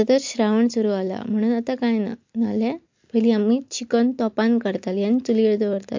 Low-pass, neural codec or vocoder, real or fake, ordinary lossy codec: 7.2 kHz; none; real; MP3, 48 kbps